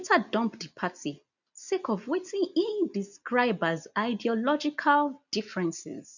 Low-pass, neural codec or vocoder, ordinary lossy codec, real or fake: 7.2 kHz; none; none; real